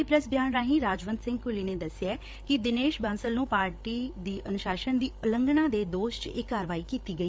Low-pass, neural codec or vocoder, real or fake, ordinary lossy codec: none; codec, 16 kHz, 8 kbps, FreqCodec, larger model; fake; none